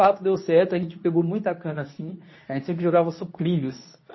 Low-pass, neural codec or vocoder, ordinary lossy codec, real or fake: 7.2 kHz; codec, 24 kHz, 0.9 kbps, WavTokenizer, medium speech release version 2; MP3, 24 kbps; fake